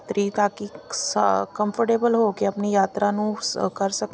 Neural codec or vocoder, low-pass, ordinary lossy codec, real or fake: none; none; none; real